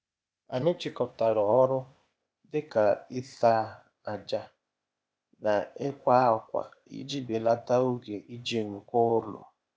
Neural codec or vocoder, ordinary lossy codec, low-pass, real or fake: codec, 16 kHz, 0.8 kbps, ZipCodec; none; none; fake